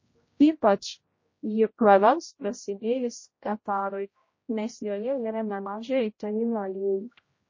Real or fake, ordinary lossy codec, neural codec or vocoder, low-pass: fake; MP3, 32 kbps; codec, 16 kHz, 0.5 kbps, X-Codec, HuBERT features, trained on general audio; 7.2 kHz